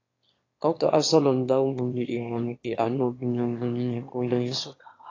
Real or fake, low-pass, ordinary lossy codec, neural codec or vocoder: fake; 7.2 kHz; AAC, 32 kbps; autoencoder, 22.05 kHz, a latent of 192 numbers a frame, VITS, trained on one speaker